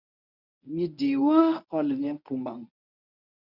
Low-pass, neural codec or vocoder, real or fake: 5.4 kHz; codec, 24 kHz, 0.9 kbps, WavTokenizer, medium speech release version 1; fake